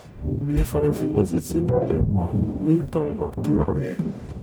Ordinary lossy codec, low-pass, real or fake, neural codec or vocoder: none; none; fake; codec, 44.1 kHz, 0.9 kbps, DAC